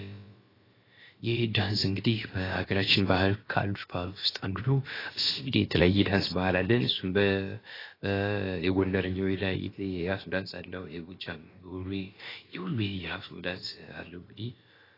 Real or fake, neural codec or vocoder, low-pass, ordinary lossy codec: fake; codec, 16 kHz, about 1 kbps, DyCAST, with the encoder's durations; 5.4 kHz; AAC, 24 kbps